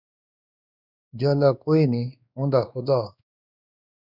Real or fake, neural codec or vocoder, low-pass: fake; codec, 16 kHz, 2 kbps, X-Codec, WavLM features, trained on Multilingual LibriSpeech; 5.4 kHz